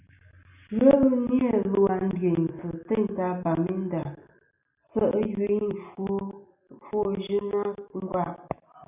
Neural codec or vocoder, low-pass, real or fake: none; 3.6 kHz; real